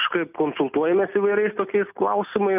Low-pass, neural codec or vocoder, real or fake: 3.6 kHz; none; real